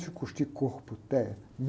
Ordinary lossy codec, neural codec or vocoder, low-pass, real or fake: none; none; none; real